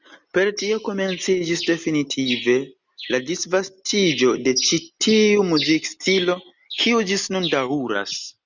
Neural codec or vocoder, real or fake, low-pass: none; real; 7.2 kHz